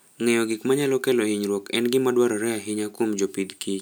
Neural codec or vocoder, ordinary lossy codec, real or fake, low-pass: none; none; real; none